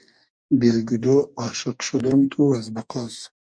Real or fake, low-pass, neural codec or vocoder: fake; 9.9 kHz; codec, 44.1 kHz, 2.6 kbps, DAC